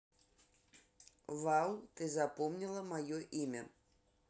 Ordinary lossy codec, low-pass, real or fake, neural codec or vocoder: none; none; real; none